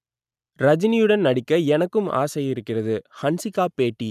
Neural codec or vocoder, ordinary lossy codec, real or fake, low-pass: none; none; real; 14.4 kHz